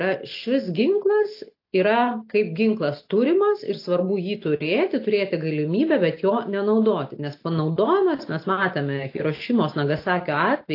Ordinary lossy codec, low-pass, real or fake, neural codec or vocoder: AAC, 32 kbps; 5.4 kHz; real; none